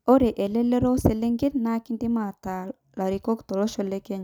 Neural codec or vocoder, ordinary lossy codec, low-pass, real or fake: none; none; 19.8 kHz; real